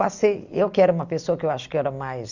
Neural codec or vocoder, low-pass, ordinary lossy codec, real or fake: none; 7.2 kHz; Opus, 64 kbps; real